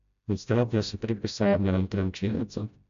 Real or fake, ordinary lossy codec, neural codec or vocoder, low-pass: fake; MP3, 64 kbps; codec, 16 kHz, 0.5 kbps, FreqCodec, smaller model; 7.2 kHz